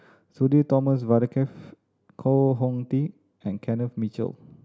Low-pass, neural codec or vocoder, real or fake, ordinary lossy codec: none; none; real; none